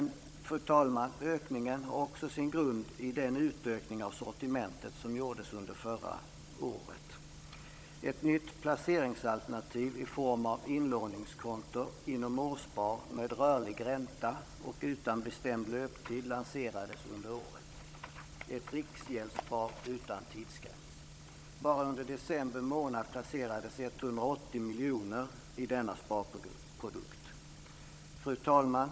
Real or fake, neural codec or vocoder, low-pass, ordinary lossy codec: fake; codec, 16 kHz, 16 kbps, FunCodec, trained on Chinese and English, 50 frames a second; none; none